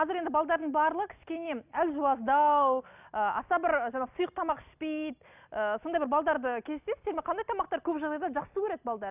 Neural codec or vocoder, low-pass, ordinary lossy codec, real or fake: none; 3.6 kHz; none; real